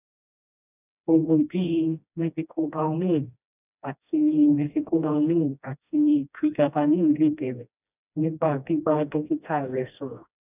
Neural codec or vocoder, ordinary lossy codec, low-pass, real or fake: codec, 16 kHz, 1 kbps, FreqCodec, smaller model; none; 3.6 kHz; fake